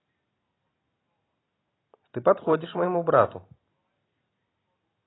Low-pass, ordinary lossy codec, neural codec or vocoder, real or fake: 7.2 kHz; AAC, 16 kbps; none; real